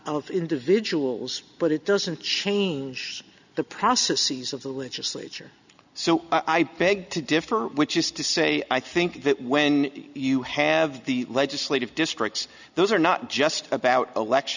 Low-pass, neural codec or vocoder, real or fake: 7.2 kHz; none; real